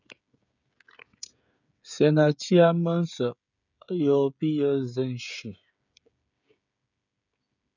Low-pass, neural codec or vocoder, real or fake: 7.2 kHz; codec, 16 kHz, 16 kbps, FreqCodec, smaller model; fake